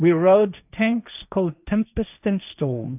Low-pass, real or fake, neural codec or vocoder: 3.6 kHz; fake; codec, 16 kHz, 1.1 kbps, Voila-Tokenizer